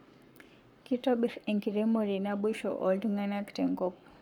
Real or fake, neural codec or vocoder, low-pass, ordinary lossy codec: fake; codec, 44.1 kHz, 7.8 kbps, Pupu-Codec; 19.8 kHz; none